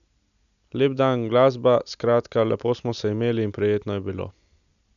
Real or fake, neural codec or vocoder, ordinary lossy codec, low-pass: real; none; none; 7.2 kHz